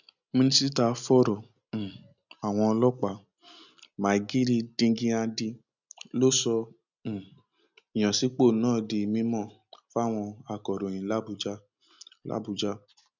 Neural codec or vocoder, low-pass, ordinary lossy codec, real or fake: none; 7.2 kHz; none; real